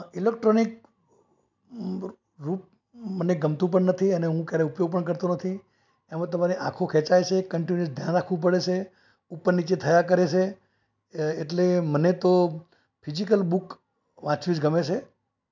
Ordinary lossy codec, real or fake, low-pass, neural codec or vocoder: MP3, 64 kbps; real; 7.2 kHz; none